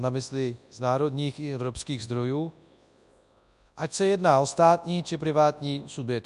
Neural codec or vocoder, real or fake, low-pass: codec, 24 kHz, 0.9 kbps, WavTokenizer, large speech release; fake; 10.8 kHz